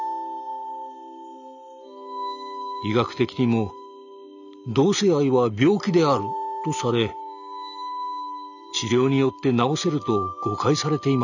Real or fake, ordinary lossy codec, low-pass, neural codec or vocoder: real; none; 7.2 kHz; none